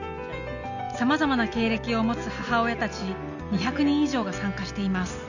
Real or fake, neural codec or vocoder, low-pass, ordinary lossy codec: real; none; 7.2 kHz; none